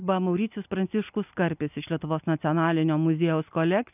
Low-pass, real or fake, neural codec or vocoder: 3.6 kHz; real; none